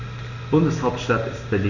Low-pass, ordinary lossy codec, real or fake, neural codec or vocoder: 7.2 kHz; none; real; none